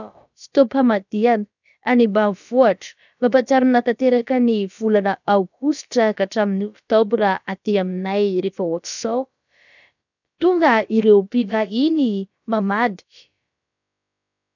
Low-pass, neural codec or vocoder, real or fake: 7.2 kHz; codec, 16 kHz, about 1 kbps, DyCAST, with the encoder's durations; fake